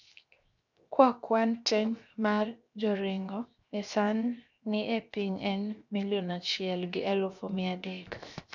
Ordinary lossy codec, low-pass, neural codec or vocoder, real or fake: none; 7.2 kHz; codec, 16 kHz, 0.7 kbps, FocalCodec; fake